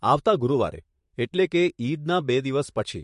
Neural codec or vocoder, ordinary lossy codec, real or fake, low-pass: vocoder, 44.1 kHz, 128 mel bands, Pupu-Vocoder; MP3, 48 kbps; fake; 14.4 kHz